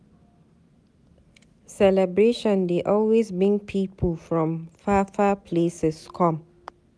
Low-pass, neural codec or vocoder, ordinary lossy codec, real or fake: none; none; none; real